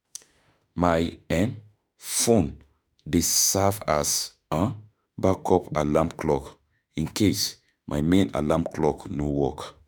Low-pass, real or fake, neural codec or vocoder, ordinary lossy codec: none; fake; autoencoder, 48 kHz, 32 numbers a frame, DAC-VAE, trained on Japanese speech; none